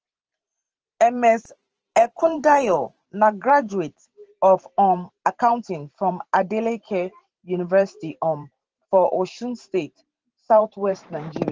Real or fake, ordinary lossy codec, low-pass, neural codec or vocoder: fake; Opus, 16 kbps; 7.2 kHz; vocoder, 44.1 kHz, 128 mel bands every 512 samples, BigVGAN v2